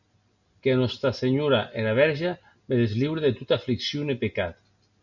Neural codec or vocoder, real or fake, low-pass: none; real; 7.2 kHz